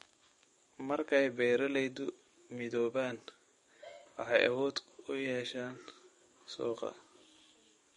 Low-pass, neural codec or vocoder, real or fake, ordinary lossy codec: 19.8 kHz; codec, 44.1 kHz, 7.8 kbps, DAC; fake; MP3, 48 kbps